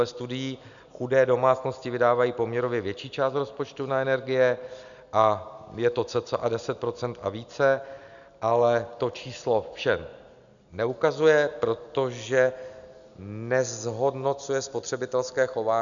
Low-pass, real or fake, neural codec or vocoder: 7.2 kHz; real; none